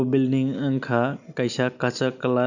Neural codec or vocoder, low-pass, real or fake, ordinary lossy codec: none; 7.2 kHz; real; none